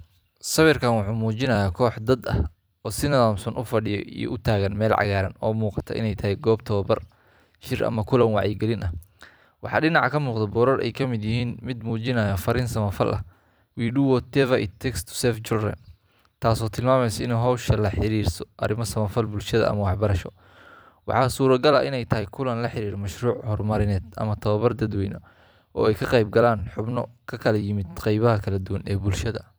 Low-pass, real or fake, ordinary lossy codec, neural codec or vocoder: none; fake; none; vocoder, 44.1 kHz, 128 mel bands every 256 samples, BigVGAN v2